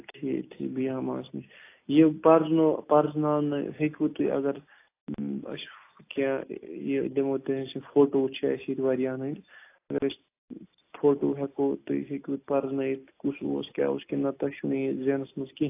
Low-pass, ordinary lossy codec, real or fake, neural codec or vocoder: 3.6 kHz; AAC, 24 kbps; real; none